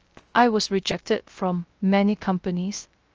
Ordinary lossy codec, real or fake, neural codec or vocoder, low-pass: Opus, 24 kbps; fake; codec, 16 kHz, 0.3 kbps, FocalCodec; 7.2 kHz